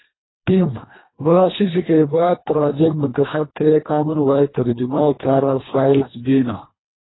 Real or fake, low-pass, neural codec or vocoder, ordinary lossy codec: fake; 7.2 kHz; codec, 24 kHz, 1.5 kbps, HILCodec; AAC, 16 kbps